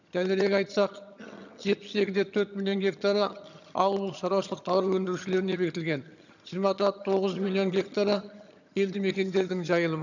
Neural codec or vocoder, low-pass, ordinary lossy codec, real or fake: vocoder, 22.05 kHz, 80 mel bands, HiFi-GAN; 7.2 kHz; none; fake